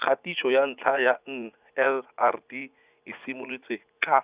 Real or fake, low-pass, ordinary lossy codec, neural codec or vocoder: fake; 3.6 kHz; Opus, 64 kbps; vocoder, 44.1 kHz, 80 mel bands, Vocos